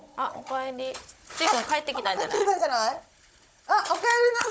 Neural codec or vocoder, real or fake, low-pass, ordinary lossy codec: codec, 16 kHz, 16 kbps, FunCodec, trained on Chinese and English, 50 frames a second; fake; none; none